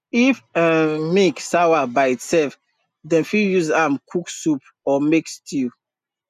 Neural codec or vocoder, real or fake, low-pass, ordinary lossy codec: none; real; 14.4 kHz; none